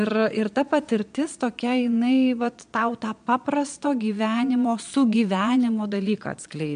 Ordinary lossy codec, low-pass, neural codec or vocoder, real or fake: MP3, 64 kbps; 9.9 kHz; vocoder, 22.05 kHz, 80 mel bands, Vocos; fake